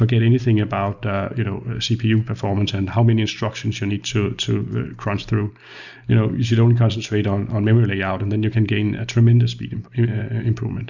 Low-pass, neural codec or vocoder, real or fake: 7.2 kHz; none; real